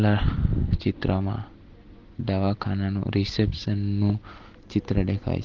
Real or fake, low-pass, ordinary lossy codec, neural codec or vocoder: real; 7.2 kHz; Opus, 16 kbps; none